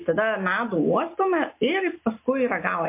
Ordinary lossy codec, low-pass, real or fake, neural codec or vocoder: MP3, 24 kbps; 3.6 kHz; real; none